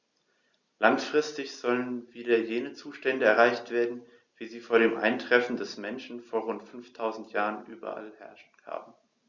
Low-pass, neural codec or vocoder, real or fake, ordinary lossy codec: 7.2 kHz; none; real; Opus, 64 kbps